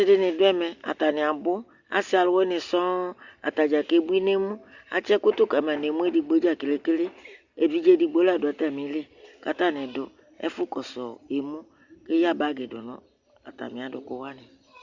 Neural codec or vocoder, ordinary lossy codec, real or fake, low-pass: none; Opus, 64 kbps; real; 7.2 kHz